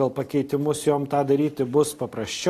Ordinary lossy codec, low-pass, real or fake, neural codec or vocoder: AAC, 48 kbps; 14.4 kHz; real; none